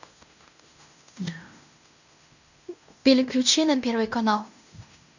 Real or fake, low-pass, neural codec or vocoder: fake; 7.2 kHz; codec, 16 kHz in and 24 kHz out, 0.9 kbps, LongCat-Audio-Codec, fine tuned four codebook decoder